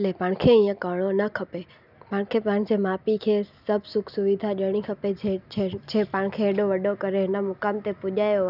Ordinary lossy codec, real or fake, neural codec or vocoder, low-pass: none; real; none; 5.4 kHz